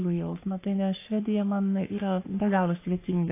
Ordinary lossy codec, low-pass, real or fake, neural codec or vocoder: AAC, 24 kbps; 3.6 kHz; fake; codec, 44.1 kHz, 3.4 kbps, Pupu-Codec